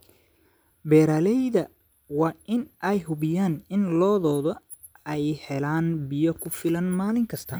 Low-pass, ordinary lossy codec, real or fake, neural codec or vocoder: none; none; real; none